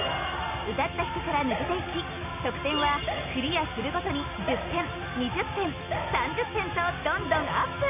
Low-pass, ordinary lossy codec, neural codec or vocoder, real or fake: 3.6 kHz; none; none; real